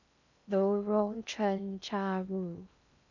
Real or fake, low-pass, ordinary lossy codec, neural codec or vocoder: fake; 7.2 kHz; none; codec, 16 kHz in and 24 kHz out, 0.6 kbps, FocalCodec, streaming, 4096 codes